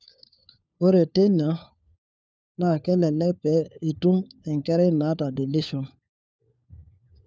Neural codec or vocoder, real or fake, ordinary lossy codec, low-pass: codec, 16 kHz, 4 kbps, FunCodec, trained on LibriTTS, 50 frames a second; fake; none; none